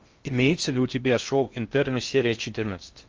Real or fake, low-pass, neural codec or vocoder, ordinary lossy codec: fake; 7.2 kHz; codec, 16 kHz in and 24 kHz out, 0.6 kbps, FocalCodec, streaming, 2048 codes; Opus, 24 kbps